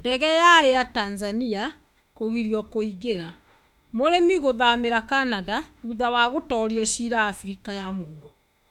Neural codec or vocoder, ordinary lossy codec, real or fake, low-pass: autoencoder, 48 kHz, 32 numbers a frame, DAC-VAE, trained on Japanese speech; none; fake; 19.8 kHz